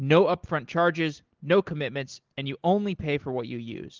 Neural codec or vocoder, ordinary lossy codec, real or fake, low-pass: none; Opus, 16 kbps; real; 7.2 kHz